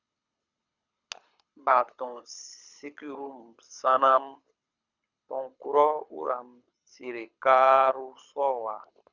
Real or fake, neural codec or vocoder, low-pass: fake; codec, 24 kHz, 6 kbps, HILCodec; 7.2 kHz